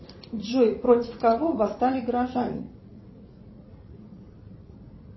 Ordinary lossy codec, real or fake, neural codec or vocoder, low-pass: MP3, 24 kbps; fake; vocoder, 44.1 kHz, 80 mel bands, Vocos; 7.2 kHz